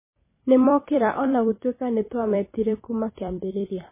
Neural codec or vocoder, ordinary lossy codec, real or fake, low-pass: vocoder, 44.1 kHz, 128 mel bands every 256 samples, BigVGAN v2; MP3, 16 kbps; fake; 3.6 kHz